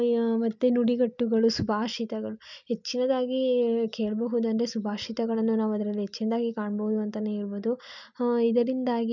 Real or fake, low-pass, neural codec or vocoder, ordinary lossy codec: real; 7.2 kHz; none; none